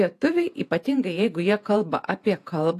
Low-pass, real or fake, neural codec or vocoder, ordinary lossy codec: 14.4 kHz; fake; vocoder, 48 kHz, 128 mel bands, Vocos; AAC, 64 kbps